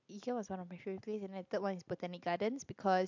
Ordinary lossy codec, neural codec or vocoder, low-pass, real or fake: MP3, 64 kbps; none; 7.2 kHz; real